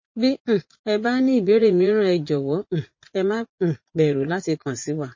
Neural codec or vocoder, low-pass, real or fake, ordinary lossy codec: vocoder, 44.1 kHz, 80 mel bands, Vocos; 7.2 kHz; fake; MP3, 32 kbps